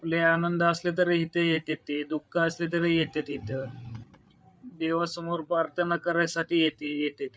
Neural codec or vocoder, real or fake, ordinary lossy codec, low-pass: codec, 16 kHz, 8 kbps, FreqCodec, larger model; fake; none; none